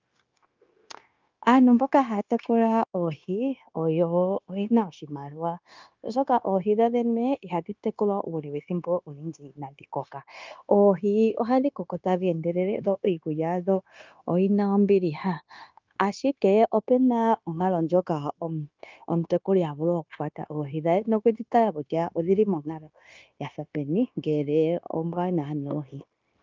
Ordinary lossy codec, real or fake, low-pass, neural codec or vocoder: Opus, 24 kbps; fake; 7.2 kHz; codec, 16 kHz, 0.9 kbps, LongCat-Audio-Codec